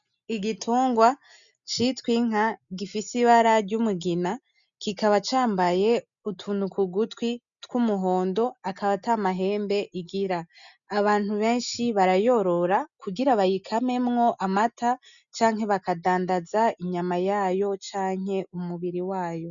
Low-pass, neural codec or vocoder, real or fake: 7.2 kHz; none; real